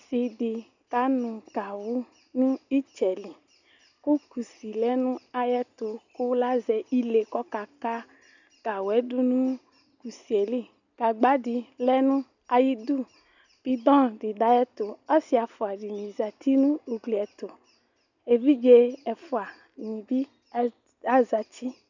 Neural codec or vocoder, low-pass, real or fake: none; 7.2 kHz; real